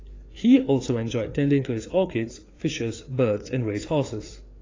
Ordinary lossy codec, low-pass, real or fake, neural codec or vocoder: AAC, 32 kbps; 7.2 kHz; fake; codec, 16 kHz, 4 kbps, FreqCodec, larger model